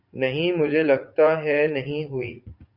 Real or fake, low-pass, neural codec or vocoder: fake; 5.4 kHz; vocoder, 44.1 kHz, 80 mel bands, Vocos